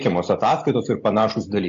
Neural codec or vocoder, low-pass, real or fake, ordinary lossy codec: none; 7.2 kHz; real; AAC, 48 kbps